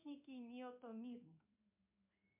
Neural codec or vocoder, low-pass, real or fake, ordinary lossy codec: codec, 16 kHz in and 24 kHz out, 1 kbps, XY-Tokenizer; 3.6 kHz; fake; MP3, 32 kbps